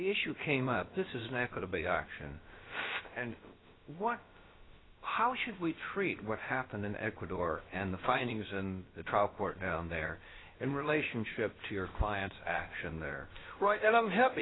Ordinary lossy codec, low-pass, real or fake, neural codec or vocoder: AAC, 16 kbps; 7.2 kHz; fake; codec, 16 kHz, about 1 kbps, DyCAST, with the encoder's durations